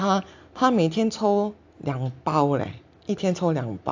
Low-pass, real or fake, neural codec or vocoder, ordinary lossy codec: 7.2 kHz; real; none; AAC, 48 kbps